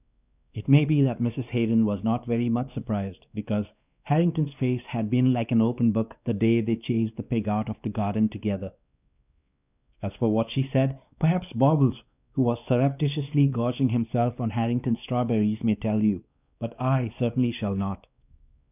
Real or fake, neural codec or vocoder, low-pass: fake; codec, 16 kHz, 2 kbps, X-Codec, WavLM features, trained on Multilingual LibriSpeech; 3.6 kHz